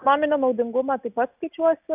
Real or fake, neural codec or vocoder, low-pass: real; none; 3.6 kHz